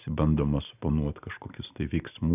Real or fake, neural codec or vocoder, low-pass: real; none; 3.6 kHz